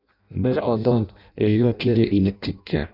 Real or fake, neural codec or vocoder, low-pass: fake; codec, 16 kHz in and 24 kHz out, 0.6 kbps, FireRedTTS-2 codec; 5.4 kHz